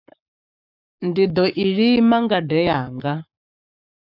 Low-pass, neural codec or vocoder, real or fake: 5.4 kHz; codec, 44.1 kHz, 7.8 kbps, Pupu-Codec; fake